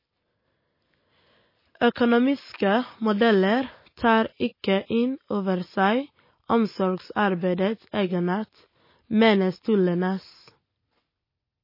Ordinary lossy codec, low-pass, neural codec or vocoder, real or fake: MP3, 24 kbps; 5.4 kHz; none; real